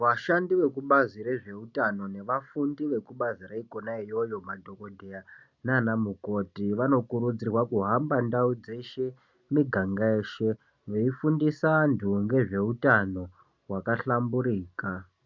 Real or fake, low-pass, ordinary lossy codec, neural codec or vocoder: real; 7.2 kHz; MP3, 64 kbps; none